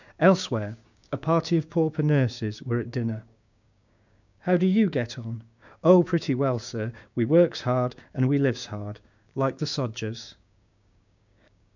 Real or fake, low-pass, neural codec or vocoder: fake; 7.2 kHz; codec, 16 kHz, 6 kbps, DAC